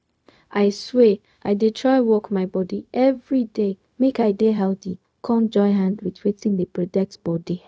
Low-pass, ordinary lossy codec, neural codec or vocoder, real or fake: none; none; codec, 16 kHz, 0.4 kbps, LongCat-Audio-Codec; fake